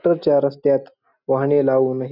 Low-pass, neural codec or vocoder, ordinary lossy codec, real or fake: 5.4 kHz; none; none; real